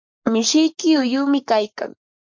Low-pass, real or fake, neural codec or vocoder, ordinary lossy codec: 7.2 kHz; fake; codec, 44.1 kHz, 7.8 kbps, Pupu-Codec; MP3, 48 kbps